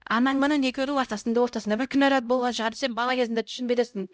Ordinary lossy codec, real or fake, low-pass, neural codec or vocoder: none; fake; none; codec, 16 kHz, 0.5 kbps, X-Codec, HuBERT features, trained on LibriSpeech